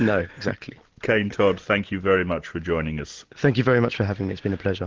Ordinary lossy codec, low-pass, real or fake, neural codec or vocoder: Opus, 16 kbps; 7.2 kHz; real; none